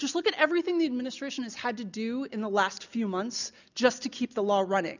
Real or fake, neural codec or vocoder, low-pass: real; none; 7.2 kHz